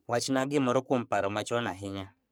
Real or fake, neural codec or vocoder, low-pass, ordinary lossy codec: fake; codec, 44.1 kHz, 3.4 kbps, Pupu-Codec; none; none